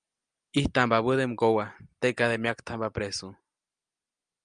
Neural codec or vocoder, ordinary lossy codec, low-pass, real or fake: none; Opus, 32 kbps; 9.9 kHz; real